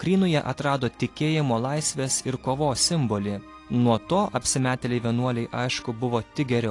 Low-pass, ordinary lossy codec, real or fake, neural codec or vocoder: 10.8 kHz; AAC, 48 kbps; real; none